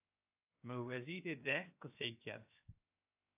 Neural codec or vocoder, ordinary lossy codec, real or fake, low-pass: codec, 16 kHz, 0.7 kbps, FocalCodec; AAC, 32 kbps; fake; 3.6 kHz